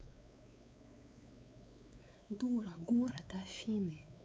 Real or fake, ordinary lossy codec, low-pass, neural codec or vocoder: fake; none; none; codec, 16 kHz, 4 kbps, X-Codec, WavLM features, trained on Multilingual LibriSpeech